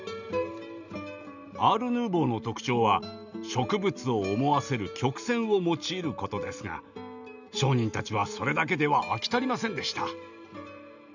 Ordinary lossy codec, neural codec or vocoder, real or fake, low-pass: none; none; real; 7.2 kHz